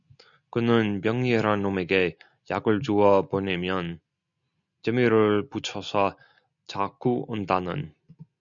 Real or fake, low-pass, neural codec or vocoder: real; 7.2 kHz; none